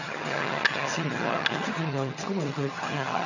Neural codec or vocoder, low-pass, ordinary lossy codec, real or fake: vocoder, 22.05 kHz, 80 mel bands, HiFi-GAN; 7.2 kHz; none; fake